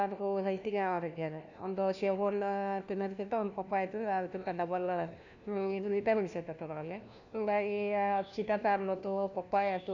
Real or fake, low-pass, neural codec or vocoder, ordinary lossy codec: fake; 7.2 kHz; codec, 16 kHz, 1 kbps, FunCodec, trained on LibriTTS, 50 frames a second; none